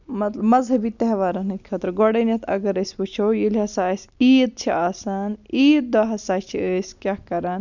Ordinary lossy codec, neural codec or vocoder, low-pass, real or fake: none; none; 7.2 kHz; real